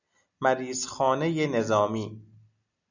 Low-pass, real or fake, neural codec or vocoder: 7.2 kHz; real; none